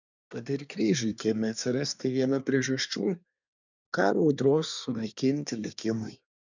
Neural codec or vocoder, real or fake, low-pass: codec, 24 kHz, 1 kbps, SNAC; fake; 7.2 kHz